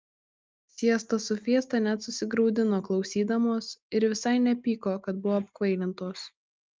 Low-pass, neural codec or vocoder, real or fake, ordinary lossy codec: 7.2 kHz; none; real; Opus, 24 kbps